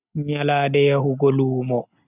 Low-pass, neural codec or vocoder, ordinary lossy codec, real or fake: 3.6 kHz; none; none; real